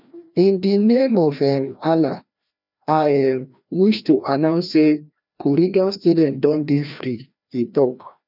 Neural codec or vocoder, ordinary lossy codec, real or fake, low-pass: codec, 16 kHz, 1 kbps, FreqCodec, larger model; none; fake; 5.4 kHz